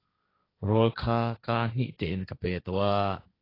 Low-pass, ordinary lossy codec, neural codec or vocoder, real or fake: 5.4 kHz; AAC, 24 kbps; codec, 16 kHz, 1.1 kbps, Voila-Tokenizer; fake